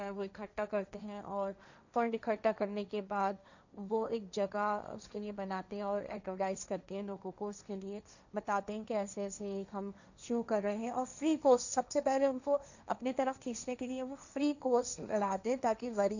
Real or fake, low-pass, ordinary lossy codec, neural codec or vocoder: fake; 7.2 kHz; none; codec, 16 kHz, 1.1 kbps, Voila-Tokenizer